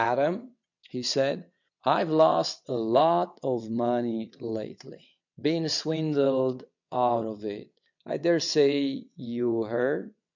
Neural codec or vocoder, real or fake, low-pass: vocoder, 22.05 kHz, 80 mel bands, WaveNeXt; fake; 7.2 kHz